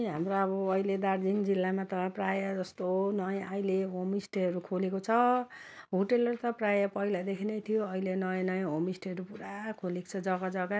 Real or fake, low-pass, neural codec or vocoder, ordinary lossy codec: real; none; none; none